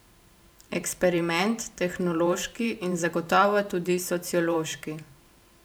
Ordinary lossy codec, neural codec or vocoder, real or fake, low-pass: none; vocoder, 44.1 kHz, 128 mel bands every 512 samples, BigVGAN v2; fake; none